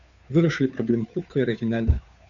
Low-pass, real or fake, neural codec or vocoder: 7.2 kHz; fake; codec, 16 kHz, 2 kbps, FunCodec, trained on Chinese and English, 25 frames a second